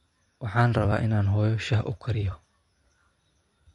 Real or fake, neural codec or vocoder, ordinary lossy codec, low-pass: fake; vocoder, 44.1 kHz, 128 mel bands every 256 samples, BigVGAN v2; MP3, 48 kbps; 14.4 kHz